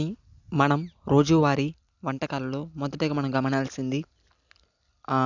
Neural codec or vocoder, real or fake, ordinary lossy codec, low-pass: none; real; none; 7.2 kHz